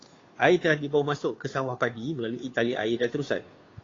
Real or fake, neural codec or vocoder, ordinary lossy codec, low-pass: fake; codec, 16 kHz, 2 kbps, FunCodec, trained on Chinese and English, 25 frames a second; AAC, 32 kbps; 7.2 kHz